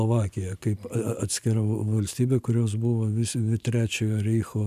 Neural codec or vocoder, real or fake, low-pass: vocoder, 44.1 kHz, 128 mel bands every 512 samples, BigVGAN v2; fake; 14.4 kHz